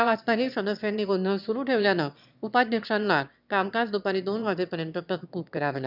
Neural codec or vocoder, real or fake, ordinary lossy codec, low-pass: autoencoder, 22.05 kHz, a latent of 192 numbers a frame, VITS, trained on one speaker; fake; none; 5.4 kHz